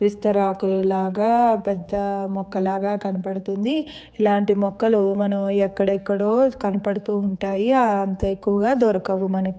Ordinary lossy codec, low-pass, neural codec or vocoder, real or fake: none; none; codec, 16 kHz, 4 kbps, X-Codec, HuBERT features, trained on general audio; fake